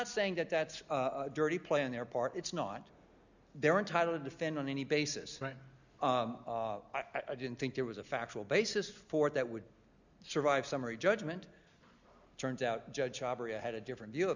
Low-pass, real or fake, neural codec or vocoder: 7.2 kHz; real; none